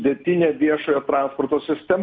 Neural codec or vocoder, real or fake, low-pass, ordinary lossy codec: none; real; 7.2 kHz; MP3, 64 kbps